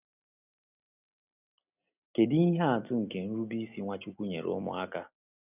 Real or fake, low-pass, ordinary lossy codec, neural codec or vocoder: real; 3.6 kHz; none; none